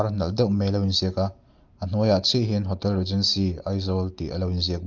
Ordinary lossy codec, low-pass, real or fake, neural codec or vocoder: Opus, 32 kbps; 7.2 kHz; real; none